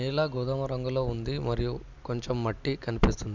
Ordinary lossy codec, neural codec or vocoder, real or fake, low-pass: none; none; real; 7.2 kHz